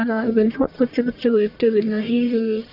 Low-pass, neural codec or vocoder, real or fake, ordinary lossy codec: 5.4 kHz; codec, 44.1 kHz, 1.7 kbps, Pupu-Codec; fake; AAC, 48 kbps